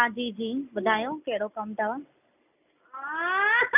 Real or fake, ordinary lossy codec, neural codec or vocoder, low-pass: real; none; none; 3.6 kHz